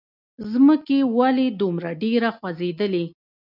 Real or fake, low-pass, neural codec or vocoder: real; 5.4 kHz; none